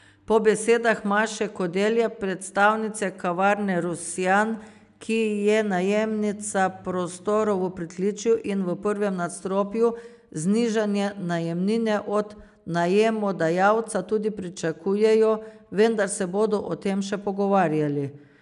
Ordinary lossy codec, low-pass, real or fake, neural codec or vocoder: none; 10.8 kHz; real; none